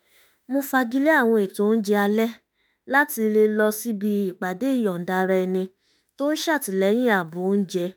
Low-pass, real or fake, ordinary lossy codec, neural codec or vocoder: none; fake; none; autoencoder, 48 kHz, 32 numbers a frame, DAC-VAE, trained on Japanese speech